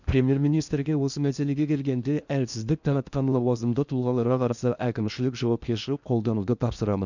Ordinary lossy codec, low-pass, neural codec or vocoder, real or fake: none; 7.2 kHz; codec, 16 kHz in and 24 kHz out, 0.8 kbps, FocalCodec, streaming, 65536 codes; fake